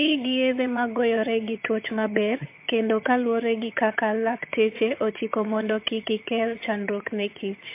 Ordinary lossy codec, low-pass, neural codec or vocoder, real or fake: MP3, 24 kbps; 3.6 kHz; vocoder, 22.05 kHz, 80 mel bands, HiFi-GAN; fake